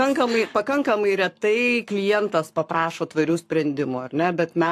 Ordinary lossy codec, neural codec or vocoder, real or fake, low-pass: AAC, 64 kbps; codec, 44.1 kHz, 7.8 kbps, Pupu-Codec; fake; 14.4 kHz